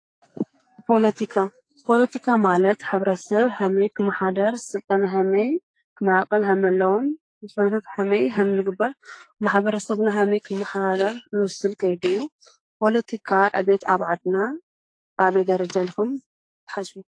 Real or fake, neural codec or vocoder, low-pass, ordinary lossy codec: fake; codec, 44.1 kHz, 2.6 kbps, SNAC; 9.9 kHz; AAC, 48 kbps